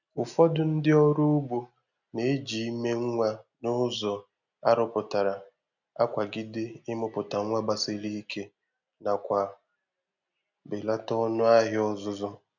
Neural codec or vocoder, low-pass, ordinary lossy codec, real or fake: none; 7.2 kHz; none; real